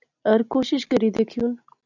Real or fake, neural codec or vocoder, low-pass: real; none; 7.2 kHz